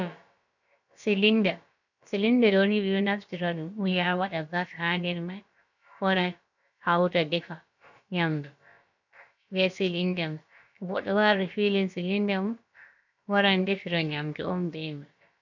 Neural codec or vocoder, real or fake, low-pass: codec, 16 kHz, about 1 kbps, DyCAST, with the encoder's durations; fake; 7.2 kHz